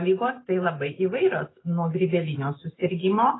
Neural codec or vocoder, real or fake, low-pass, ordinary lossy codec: none; real; 7.2 kHz; AAC, 16 kbps